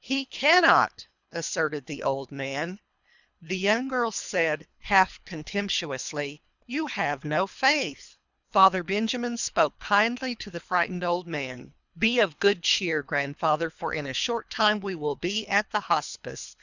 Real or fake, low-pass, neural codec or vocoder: fake; 7.2 kHz; codec, 24 kHz, 3 kbps, HILCodec